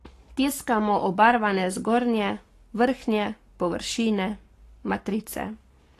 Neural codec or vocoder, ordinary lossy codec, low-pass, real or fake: codec, 44.1 kHz, 7.8 kbps, Pupu-Codec; AAC, 48 kbps; 14.4 kHz; fake